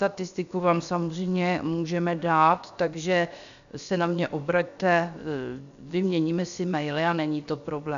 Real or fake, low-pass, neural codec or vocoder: fake; 7.2 kHz; codec, 16 kHz, 0.7 kbps, FocalCodec